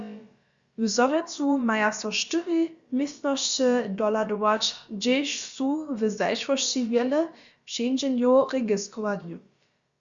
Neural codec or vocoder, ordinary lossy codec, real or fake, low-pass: codec, 16 kHz, about 1 kbps, DyCAST, with the encoder's durations; Opus, 64 kbps; fake; 7.2 kHz